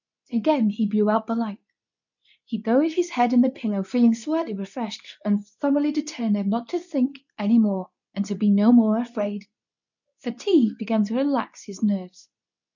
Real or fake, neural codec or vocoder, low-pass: fake; codec, 24 kHz, 0.9 kbps, WavTokenizer, medium speech release version 2; 7.2 kHz